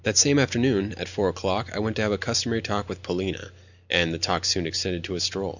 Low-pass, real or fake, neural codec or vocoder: 7.2 kHz; real; none